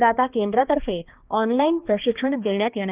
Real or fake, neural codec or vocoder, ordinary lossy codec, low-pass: fake; codec, 16 kHz, 2 kbps, X-Codec, HuBERT features, trained on balanced general audio; Opus, 32 kbps; 3.6 kHz